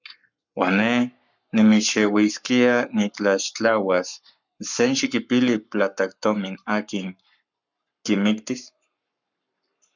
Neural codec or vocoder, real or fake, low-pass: codec, 44.1 kHz, 7.8 kbps, Pupu-Codec; fake; 7.2 kHz